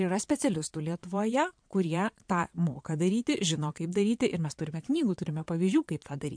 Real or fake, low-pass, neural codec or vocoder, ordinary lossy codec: fake; 9.9 kHz; vocoder, 22.05 kHz, 80 mel bands, Vocos; MP3, 64 kbps